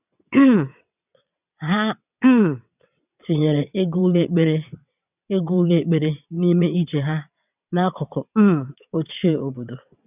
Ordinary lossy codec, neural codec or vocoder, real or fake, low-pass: none; vocoder, 22.05 kHz, 80 mel bands, WaveNeXt; fake; 3.6 kHz